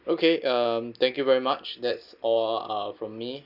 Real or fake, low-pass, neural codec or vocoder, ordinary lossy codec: real; 5.4 kHz; none; none